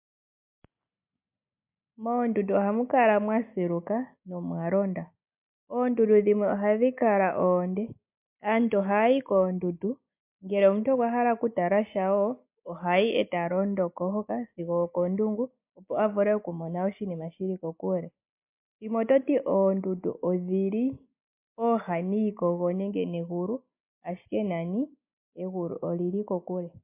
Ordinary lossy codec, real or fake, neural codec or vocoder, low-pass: MP3, 32 kbps; real; none; 3.6 kHz